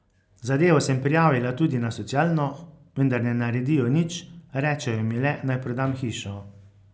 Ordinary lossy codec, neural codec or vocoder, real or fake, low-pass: none; none; real; none